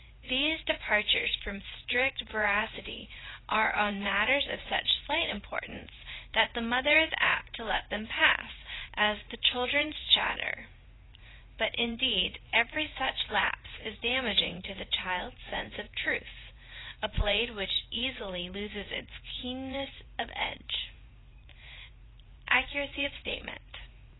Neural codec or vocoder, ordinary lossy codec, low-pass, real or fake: vocoder, 44.1 kHz, 80 mel bands, Vocos; AAC, 16 kbps; 7.2 kHz; fake